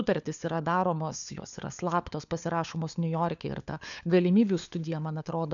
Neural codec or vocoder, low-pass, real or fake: codec, 16 kHz, 4 kbps, FunCodec, trained on LibriTTS, 50 frames a second; 7.2 kHz; fake